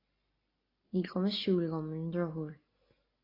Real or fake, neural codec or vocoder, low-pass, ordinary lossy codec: real; none; 5.4 kHz; AAC, 24 kbps